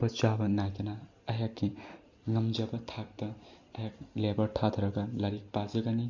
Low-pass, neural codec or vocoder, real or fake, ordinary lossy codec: 7.2 kHz; none; real; none